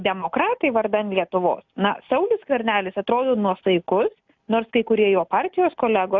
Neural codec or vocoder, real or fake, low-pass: none; real; 7.2 kHz